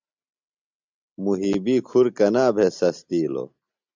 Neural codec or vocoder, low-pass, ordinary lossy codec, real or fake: none; 7.2 kHz; MP3, 64 kbps; real